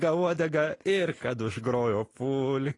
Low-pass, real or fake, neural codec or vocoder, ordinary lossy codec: 10.8 kHz; real; none; AAC, 32 kbps